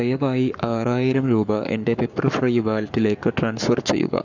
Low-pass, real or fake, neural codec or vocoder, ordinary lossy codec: 7.2 kHz; fake; codec, 44.1 kHz, 7.8 kbps, Pupu-Codec; none